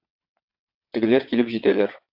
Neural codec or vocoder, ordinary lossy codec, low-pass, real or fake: vocoder, 22.05 kHz, 80 mel bands, WaveNeXt; AAC, 48 kbps; 5.4 kHz; fake